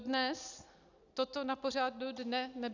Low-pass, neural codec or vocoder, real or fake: 7.2 kHz; none; real